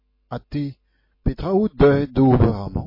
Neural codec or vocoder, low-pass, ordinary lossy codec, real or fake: none; 5.4 kHz; MP3, 24 kbps; real